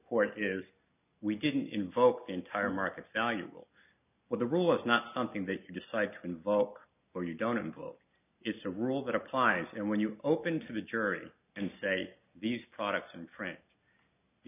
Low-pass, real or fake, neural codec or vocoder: 3.6 kHz; real; none